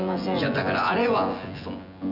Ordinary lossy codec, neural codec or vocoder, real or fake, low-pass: none; vocoder, 24 kHz, 100 mel bands, Vocos; fake; 5.4 kHz